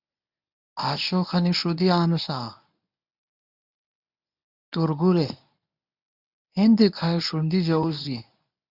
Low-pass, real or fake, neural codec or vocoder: 5.4 kHz; fake; codec, 24 kHz, 0.9 kbps, WavTokenizer, medium speech release version 1